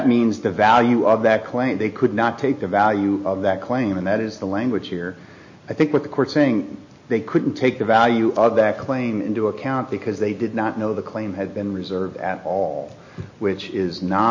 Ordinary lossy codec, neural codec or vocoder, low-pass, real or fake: MP3, 32 kbps; none; 7.2 kHz; real